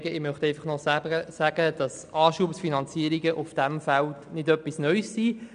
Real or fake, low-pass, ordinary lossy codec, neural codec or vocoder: real; 9.9 kHz; none; none